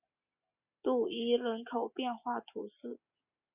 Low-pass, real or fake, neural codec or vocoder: 3.6 kHz; real; none